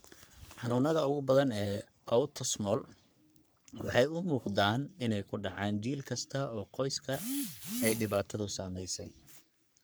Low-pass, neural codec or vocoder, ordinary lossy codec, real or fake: none; codec, 44.1 kHz, 3.4 kbps, Pupu-Codec; none; fake